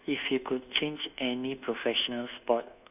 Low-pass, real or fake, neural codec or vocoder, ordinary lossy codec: 3.6 kHz; fake; codec, 24 kHz, 1.2 kbps, DualCodec; none